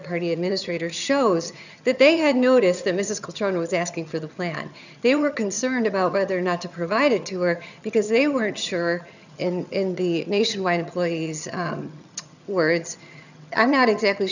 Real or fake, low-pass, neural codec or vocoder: fake; 7.2 kHz; vocoder, 22.05 kHz, 80 mel bands, HiFi-GAN